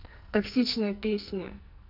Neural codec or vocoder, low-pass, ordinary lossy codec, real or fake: codec, 44.1 kHz, 2.6 kbps, SNAC; 5.4 kHz; none; fake